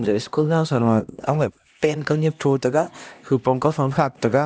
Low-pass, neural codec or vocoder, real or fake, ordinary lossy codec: none; codec, 16 kHz, 1 kbps, X-Codec, HuBERT features, trained on LibriSpeech; fake; none